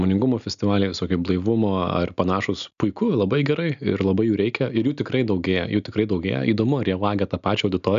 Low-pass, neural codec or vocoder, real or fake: 7.2 kHz; none; real